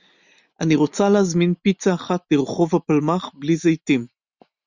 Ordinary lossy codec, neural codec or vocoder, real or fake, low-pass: Opus, 64 kbps; none; real; 7.2 kHz